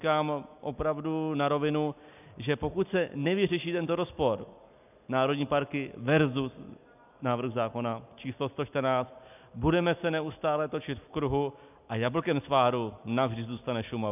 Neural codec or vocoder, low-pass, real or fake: none; 3.6 kHz; real